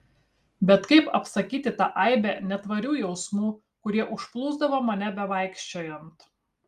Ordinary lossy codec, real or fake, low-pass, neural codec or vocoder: Opus, 32 kbps; real; 14.4 kHz; none